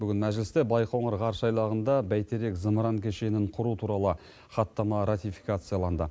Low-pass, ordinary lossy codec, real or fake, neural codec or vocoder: none; none; real; none